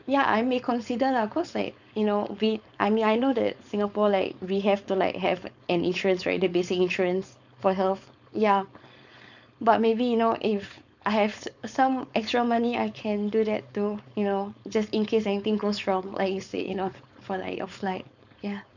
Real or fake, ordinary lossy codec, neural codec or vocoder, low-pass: fake; none; codec, 16 kHz, 4.8 kbps, FACodec; 7.2 kHz